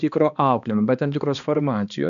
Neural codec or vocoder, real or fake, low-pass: codec, 16 kHz, 2 kbps, X-Codec, HuBERT features, trained on balanced general audio; fake; 7.2 kHz